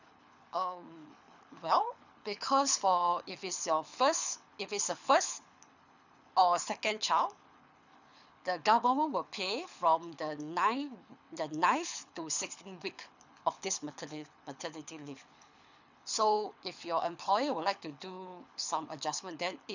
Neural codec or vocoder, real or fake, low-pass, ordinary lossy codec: codec, 24 kHz, 6 kbps, HILCodec; fake; 7.2 kHz; none